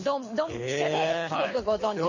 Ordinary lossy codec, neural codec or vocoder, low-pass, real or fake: MP3, 32 kbps; codec, 24 kHz, 6 kbps, HILCodec; 7.2 kHz; fake